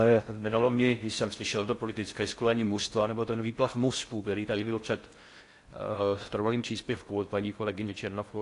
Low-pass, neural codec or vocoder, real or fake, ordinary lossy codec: 10.8 kHz; codec, 16 kHz in and 24 kHz out, 0.6 kbps, FocalCodec, streaming, 4096 codes; fake; AAC, 48 kbps